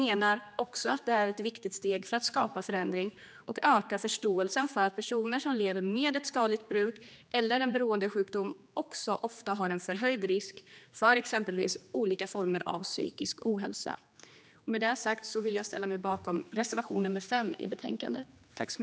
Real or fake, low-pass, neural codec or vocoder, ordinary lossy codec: fake; none; codec, 16 kHz, 2 kbps, X-Codec, HuBERT features, trained on general audio; none